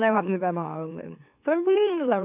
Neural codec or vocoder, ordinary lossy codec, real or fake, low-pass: autoencoder, 44.1 kHz, a latent of 192 numbers a frame, MeloTTS; none; fake; 3.6 kHz